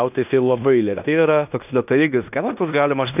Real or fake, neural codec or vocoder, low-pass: fake; codec, 16 kHz in and 24 kHz out, 0.9 kbps, LongCat-Audio-Codec, fine tuned four codebook decoder; 3.6 kHz